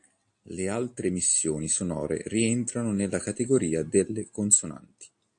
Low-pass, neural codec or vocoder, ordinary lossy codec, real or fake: 9.9 kHz; none; AAC, 64 kbps; real